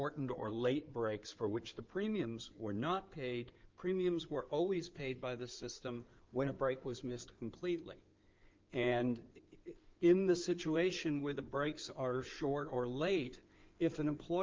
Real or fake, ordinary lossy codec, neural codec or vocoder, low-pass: fake; Opus, 24 kbps; codec, 16 kHz in and 24 kHz out, 2.2 kbps, FireRedTTS-2 codec; 7.2 kHz